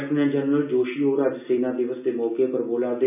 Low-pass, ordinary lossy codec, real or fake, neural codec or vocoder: 3.6 kHz; none; real; none